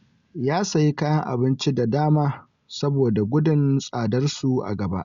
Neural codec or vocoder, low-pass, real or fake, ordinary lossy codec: none; 7.2 kHz; real; Opus, 64 kbps